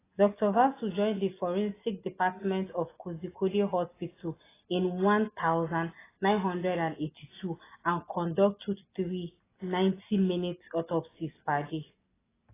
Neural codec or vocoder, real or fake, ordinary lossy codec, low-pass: none; real; AAC, 16 kbps; 3.6 kHz